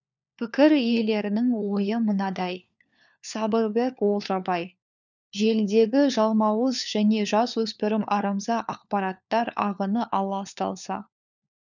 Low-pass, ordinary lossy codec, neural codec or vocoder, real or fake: 7.2 kHz; none; codec, 16 kHz, 4 kbps, FunCodec, trained on LibriTTS, 50 frames a second; fake